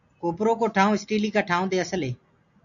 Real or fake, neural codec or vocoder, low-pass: real; none; 7.2 kHz